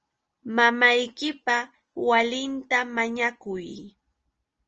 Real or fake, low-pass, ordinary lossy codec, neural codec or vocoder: real; 7.2 kHz; Opus, 24 kbps; none